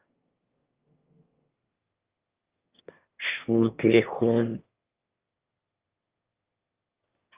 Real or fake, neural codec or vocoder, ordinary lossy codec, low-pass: fake; autoencoder, 22.05 kHz, a latent of 192 numbers a frame, VITS, trained on one speaker; Opus, 24 kbps; 3.6 kHz